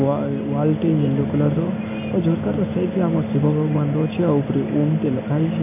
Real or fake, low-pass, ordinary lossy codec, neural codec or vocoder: real; 3.6 kHz; none; none